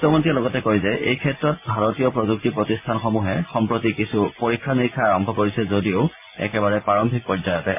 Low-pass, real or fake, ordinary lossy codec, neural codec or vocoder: 3.6 kHz; real; MP3, 32 kbps; none